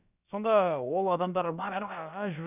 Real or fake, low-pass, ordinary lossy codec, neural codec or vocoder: fake; 3.6 kHz; none; codec, 16 kHz, about 1 kbps, DyCAST, with the encoder's durations